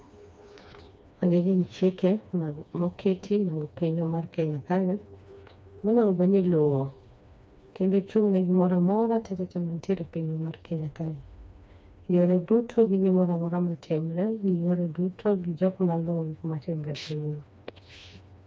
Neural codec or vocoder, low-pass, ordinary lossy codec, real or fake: codec, 16 kHz, 2 kbps, FreqCodec, smaller model; none; none; fake